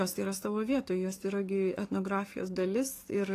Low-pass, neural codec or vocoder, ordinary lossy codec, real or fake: 14.4 kHz; codec, 44.1 kHz, 7.8 kbps, DAC; AAC, 48 kbps; fake